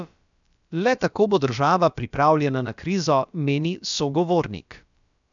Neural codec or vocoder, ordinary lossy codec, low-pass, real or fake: codec, 16 kHz, about 1 kbps, DyCAST, with the encoder's durations; none; 7.2 kHz; fake